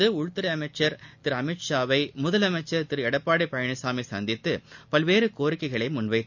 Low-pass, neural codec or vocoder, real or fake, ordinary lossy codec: 7.2 kHz; none; real; none